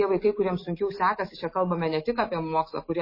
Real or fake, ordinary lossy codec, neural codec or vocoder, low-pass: real; MP3, 24 kbps; none; 5.4 kHz